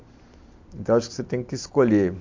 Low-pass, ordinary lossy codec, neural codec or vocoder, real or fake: 7.2 kHz; MP3, 48 kbps; none; real